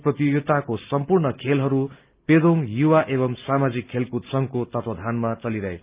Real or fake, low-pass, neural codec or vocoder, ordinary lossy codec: real; 3.6 kHz; none; Opus, 32 kbps